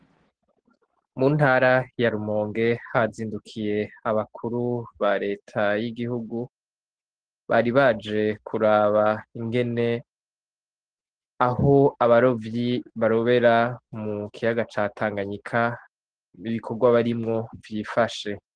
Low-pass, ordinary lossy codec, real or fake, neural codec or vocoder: 9.9 kHz; Opus, 16 kbps; real; none